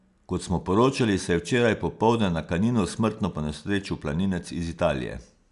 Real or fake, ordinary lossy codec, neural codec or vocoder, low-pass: real; none; none; 10.8 kHz